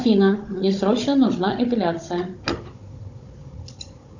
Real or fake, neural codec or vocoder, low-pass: fake; codec, 16 kHz, 16 kbps, FunCodec, trained on Chinese and English, 50 frames a second; 7.2 kHz